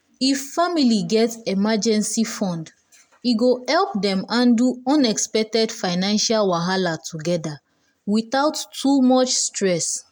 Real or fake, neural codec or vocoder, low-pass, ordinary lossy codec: real; none; none; none